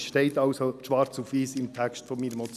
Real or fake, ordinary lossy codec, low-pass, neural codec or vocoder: real; none; 14.4 kHz; none